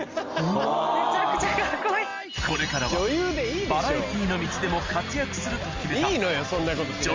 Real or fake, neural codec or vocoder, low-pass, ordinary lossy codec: real; none; 7.2 kHz; Opus, 32 kbps